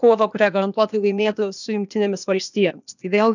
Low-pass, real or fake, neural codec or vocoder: 7.2 kHz; fake; codec, 16 kHz, 0.8 kbps, ZipCodec